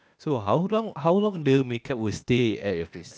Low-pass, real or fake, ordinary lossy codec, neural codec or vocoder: none; fake; none; codec, 16 kHz, 0.8 kbps, ZipCodec